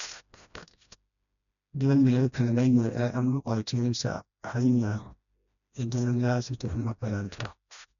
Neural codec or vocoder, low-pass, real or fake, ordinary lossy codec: codec, 16 kHz, 1 kbps, FreqCodec, smaller model; 7.2 kHz; fake; none